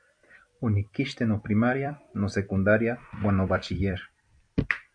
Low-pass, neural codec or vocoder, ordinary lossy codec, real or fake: 9.9 kHz; vocoder, 44.1 kHz, 128 mel bands every 256 samples, BigVGAN v2; AAC, 64 kbps; fake